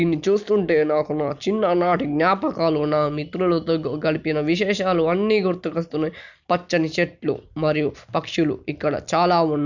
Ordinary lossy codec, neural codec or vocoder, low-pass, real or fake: none; none; 7.2 kHz; real